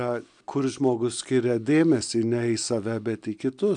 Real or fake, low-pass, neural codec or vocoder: real; 9.9 kHz; none